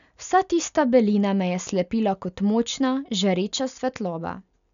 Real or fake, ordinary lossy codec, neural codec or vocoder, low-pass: real; none; none; 7.2 kHz